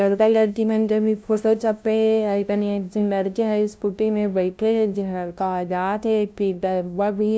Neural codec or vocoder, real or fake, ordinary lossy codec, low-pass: codec, 16 kHz, 0.5 kbps, FunCodec, trained on LibriTTS, 25 frames a second; fake; none; none